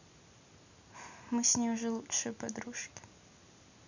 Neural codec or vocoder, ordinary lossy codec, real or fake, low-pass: none; none; real; 7.2 kHz